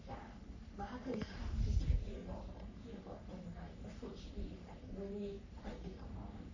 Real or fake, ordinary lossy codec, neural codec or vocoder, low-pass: fake; none; codec, 44.1 kHz, 3.4 kbps, Pupu-Codec; 7.2 kHz